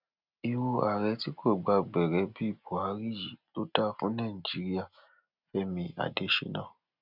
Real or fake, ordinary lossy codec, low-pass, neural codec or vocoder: real; none; 5.4 kHz; none